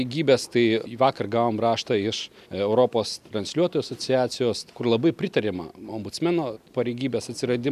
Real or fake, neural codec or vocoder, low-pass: real; none; 14.4 kHz